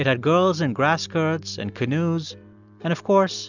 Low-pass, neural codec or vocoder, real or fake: 7.2 kHz; none; real